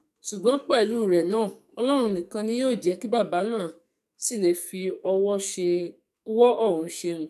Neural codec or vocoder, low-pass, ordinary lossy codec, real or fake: codec, 44.1 kHz, 2.6 kbps, SNAC; 14.4 kHz; none; fake